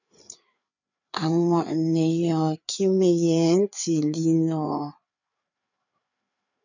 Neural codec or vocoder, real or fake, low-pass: codec, 16 kHz, 4 kbps, FreqCodec, larger model; fake; 7.2 kHz